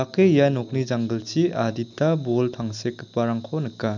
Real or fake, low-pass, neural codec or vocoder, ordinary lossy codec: real; 7.2 kHz; none; none